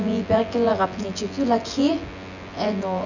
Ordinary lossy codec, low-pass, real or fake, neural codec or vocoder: none; 7.2 kHz; fake; vocoder, 24 kHz, 100 mel bands, Vocos